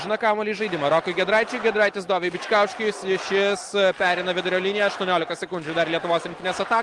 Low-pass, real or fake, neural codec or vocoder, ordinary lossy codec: 10.8 kHz; real; none; Opus, 24 kbps